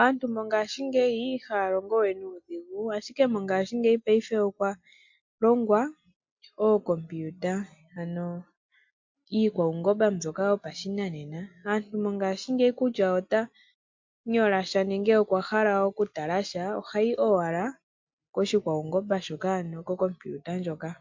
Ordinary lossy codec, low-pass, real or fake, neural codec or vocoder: MP3, 48 kbps; 7.2 kHz; real; none